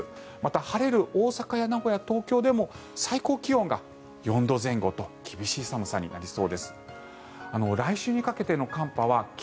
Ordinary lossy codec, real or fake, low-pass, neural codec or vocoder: none; real; none; none